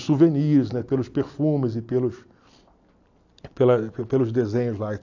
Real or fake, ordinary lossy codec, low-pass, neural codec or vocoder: real; AAC, 48 kbps; 7.2 kHz; none